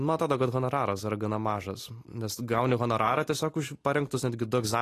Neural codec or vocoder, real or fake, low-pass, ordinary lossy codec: none; real; 14.4 kHz; AAC, 48 kbps